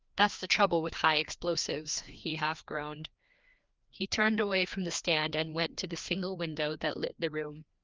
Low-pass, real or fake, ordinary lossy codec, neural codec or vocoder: 7.2 kHz; fake; Opus, 32 kbps; codec, 16 kHz, 2 kbps, FreqCodec, larger model